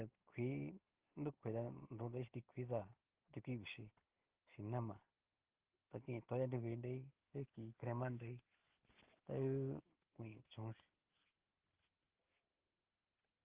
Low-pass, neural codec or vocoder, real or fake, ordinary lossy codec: 3.6 kHz; none; real; Opus, 16 kbps